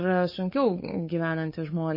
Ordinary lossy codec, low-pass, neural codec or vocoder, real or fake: MP3, 24 kbps; 5.4 kHz; codec, 16 kHz, 8 kbps, FunCodec, trained on LibriTTS, 25 frames a second; fake